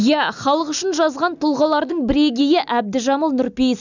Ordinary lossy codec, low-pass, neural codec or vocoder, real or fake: none; 7.2 kHz; none; real